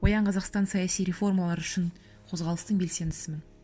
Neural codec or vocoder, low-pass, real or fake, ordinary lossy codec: none; none; real; none